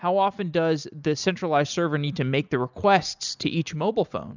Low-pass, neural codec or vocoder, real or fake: 7.2 kHz; none; real